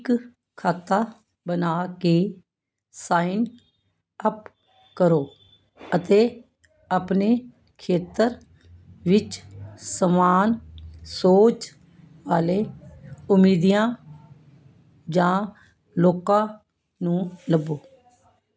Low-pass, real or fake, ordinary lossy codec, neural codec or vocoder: none; real; none; none